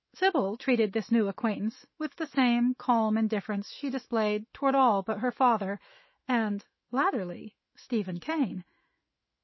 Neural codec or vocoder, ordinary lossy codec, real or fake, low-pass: autoencoder, 48 kHz, 128 numbers a frame, DAC-VAE, trained on Japanese speech; MP3, 24 kbps; fake; 7.2 kHz